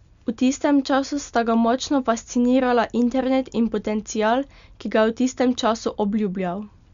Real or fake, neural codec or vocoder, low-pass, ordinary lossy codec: real; none; 7.2 kHz; Opus, 64 kbps